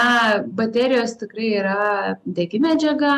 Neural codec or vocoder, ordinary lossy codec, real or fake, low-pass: none; MP3, 96 kbps; real; 14.4 kHz